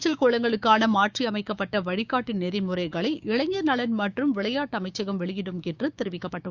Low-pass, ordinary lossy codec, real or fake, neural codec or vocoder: 7.2 kHz; Opus, 64 kbps; fake; codec, 16 kHz, 6 kbps, DAC